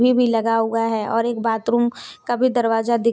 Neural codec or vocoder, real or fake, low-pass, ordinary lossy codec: none; real; none; none